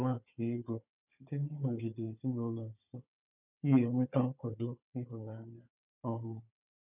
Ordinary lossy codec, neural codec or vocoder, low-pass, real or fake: none; codec, 16 kHz, 4 kbps, FunCodec, trained on Chinese and English, 50 frames a second; 3.6 kHz; fake